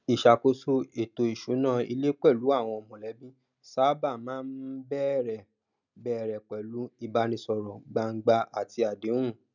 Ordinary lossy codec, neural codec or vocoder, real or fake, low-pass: none; none; real; 7.2 kHz